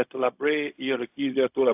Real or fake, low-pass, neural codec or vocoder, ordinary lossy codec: fake; 3.6 kHz; codec, 16 kHz, 0.4 kbps, LongCat-Audio-Codec; AAC, 32 kbps